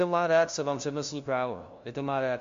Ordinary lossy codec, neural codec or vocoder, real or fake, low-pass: MP3, 48 kbps; codec, 16 kHz, 0.5 kbps, FunCodec, trained on LibriTTS, 25 frames a second; fake; 7.2 kHz